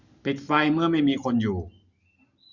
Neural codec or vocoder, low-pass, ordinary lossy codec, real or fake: none; 7.2 kHz; none; real